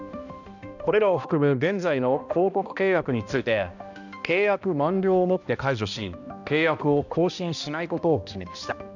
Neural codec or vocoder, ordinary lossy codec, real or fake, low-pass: codec, 16 kHz, 1 kbps, X-Codec, HuBERT features, trained on balanced general audio; none; fake; 7.2 kHz